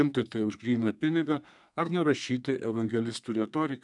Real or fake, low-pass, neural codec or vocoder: fake; 10.8 kHz; codec, 32 kHz, 1.9 kbps, SNAC